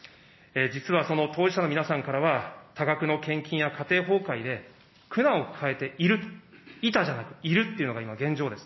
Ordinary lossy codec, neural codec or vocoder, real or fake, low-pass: MP3, 24 kbps; none; real; 7.2 kHz